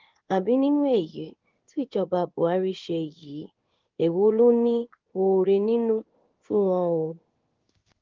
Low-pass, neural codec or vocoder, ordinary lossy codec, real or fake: 7.2 kHz; codec, 16 kHz in and 24 kHz out, 1 kbps, XY-Tokenizer; Opus, 32 kbps; fake